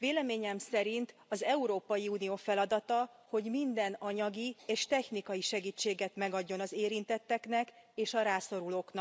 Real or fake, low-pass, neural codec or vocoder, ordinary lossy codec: real; none; none; none